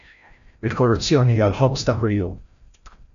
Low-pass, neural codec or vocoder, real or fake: 7.2 kHz; codec, 16 kHz, 0.5 kbps, FreqCodec, larger model; fake